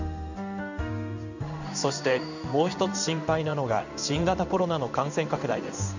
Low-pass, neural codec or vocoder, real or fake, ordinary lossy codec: 7.2 kHz; codec, 16 kHz in and 24 kHz out, 1 kbps, XY-Tokenizer; fake; none